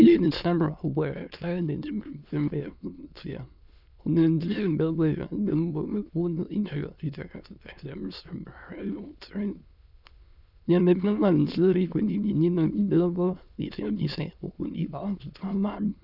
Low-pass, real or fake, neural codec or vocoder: 5.4 kHz; fake; autoencoder, 22.05 kHz, a latent of 192 numbers a frame, VITS, trained on many speakers